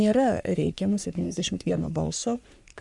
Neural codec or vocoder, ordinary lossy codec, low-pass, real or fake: codec, 44.1 kHz, 3.4 kbps, Pupu-Codec; MP3, 96 kbps; 10.8 kHz; fake